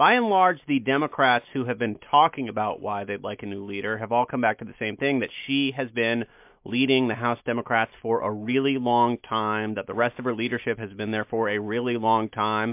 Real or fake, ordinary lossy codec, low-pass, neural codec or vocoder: real; MP3, 32 kbps; 3.6 kHz; none